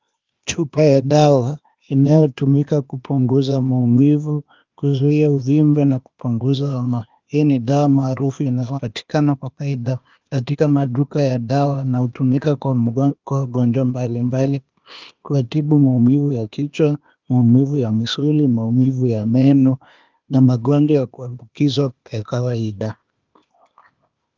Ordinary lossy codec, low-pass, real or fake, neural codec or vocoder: Opus, 24 kbps; 7.2 kHz; fake; codec, 16 kHz, 0.8 kbps, ZipCodec